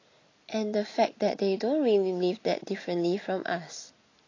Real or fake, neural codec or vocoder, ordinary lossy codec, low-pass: real; none; AAC, 32 kbps; 7.2 kHz